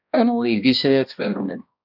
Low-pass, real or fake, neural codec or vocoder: 5.4 kHz; fake; codec, 16 kHz, 1 kbps, X-Codec, HuBERT features, trained on balanced general audio